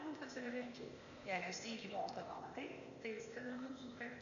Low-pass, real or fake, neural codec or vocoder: 7.2 kHz; fake; codec, 16 kHz, 0.8 kbps, ZipCodec